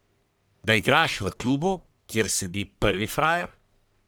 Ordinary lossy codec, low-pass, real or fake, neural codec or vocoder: none; none; fake; codec, 44.1 kHz, 1.7 kbps, Pupu-Codec